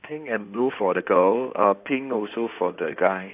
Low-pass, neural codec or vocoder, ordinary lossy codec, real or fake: 3.6 kHz; codec, 16 kHz in and 24 kHz out, 2.2 kbps, FireRedTTS-2 codec; AAC, 32 kbps; fake